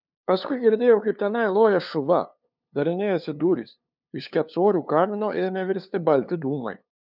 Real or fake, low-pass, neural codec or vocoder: fake; 5.4 kHz; codec, 16 kHz, 2 kbps, FunCodec, trained on LibriTTS, 25 frames a second